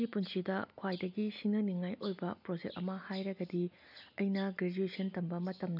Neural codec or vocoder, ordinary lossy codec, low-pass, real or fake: none; none; 5.4 kHz; real